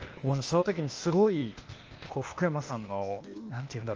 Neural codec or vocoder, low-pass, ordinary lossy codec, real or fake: codec, 16 kHz, 0.8 kbps, ZipCodec; 7.2 kHz; Opus, 24 kbps; fake